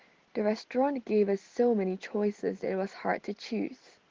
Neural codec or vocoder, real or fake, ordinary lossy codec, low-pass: none; real; Opus, 16 kbps; 7.2 kHz